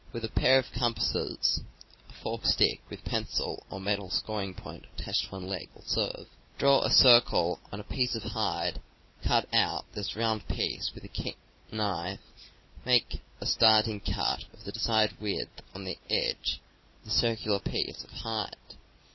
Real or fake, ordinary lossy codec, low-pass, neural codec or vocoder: real; MP3, 24 kbps; 7.2 kHz; none